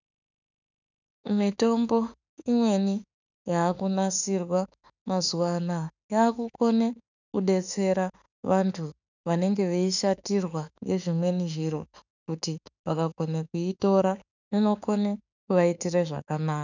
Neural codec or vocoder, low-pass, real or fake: autoencoder, 48 kHz, 32 numbers a frame, DAC-VAE, trained on Japanese speech; 7.2 kHz; fake